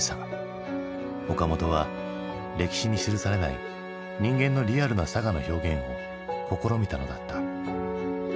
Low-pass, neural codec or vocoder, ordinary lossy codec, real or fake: none; none; none; real